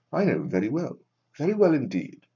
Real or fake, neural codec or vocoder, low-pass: fake; codec, 44.1 kHz, 7.8 kbps, Pupu-Codec; 7.2 kHz